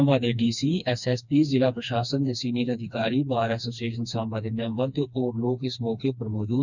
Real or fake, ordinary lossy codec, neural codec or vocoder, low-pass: fake; none; codec, 16 kHz, 2 kbps, FreqCodec, smaller model; 7.2 kHz